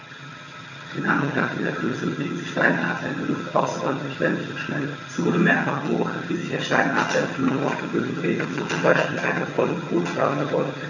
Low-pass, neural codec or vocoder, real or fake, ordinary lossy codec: 7.2 kHz; vocoder, 22.05 kHz, 80 mel bands, HiFi-GAN; fake; none